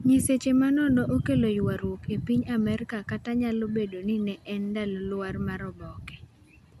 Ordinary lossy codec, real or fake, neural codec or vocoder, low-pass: MP3, 96 kbps; real; none; 14.4 kHz